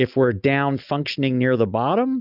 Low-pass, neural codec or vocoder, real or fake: 5.4 kHz; none; real